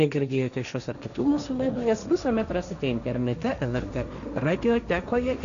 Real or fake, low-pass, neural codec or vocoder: fake; 7.2 kHz; codec, 16 kHz, 1.1 kbps, Voila-Tokenizer